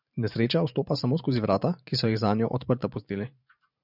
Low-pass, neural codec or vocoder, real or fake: 5.4 kHz; vocoder, 44.1 kHz, 128 mel bands every 512 samples, BigVGAN v2; fake